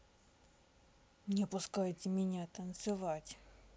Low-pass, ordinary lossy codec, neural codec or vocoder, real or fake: none; none; none; real